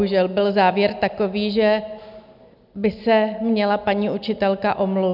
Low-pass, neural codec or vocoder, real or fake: 5.4 kHz; none; real